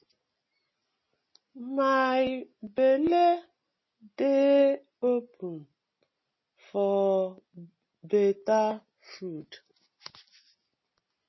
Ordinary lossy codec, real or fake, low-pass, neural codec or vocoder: MP3, 24 kbps; real; 7.2 kHz; none